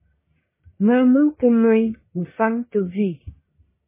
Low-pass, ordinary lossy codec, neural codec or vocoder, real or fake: 3.6 kHz; MP3, 16 kbps; codec, 44.1 kHz, 1.7 kbps, Pupu-Codec; fake